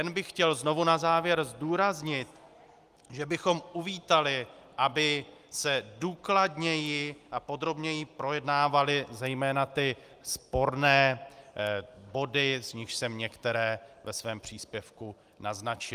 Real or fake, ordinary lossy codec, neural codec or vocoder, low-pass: real; Opus, 32 kbps; none; 14.4 kHz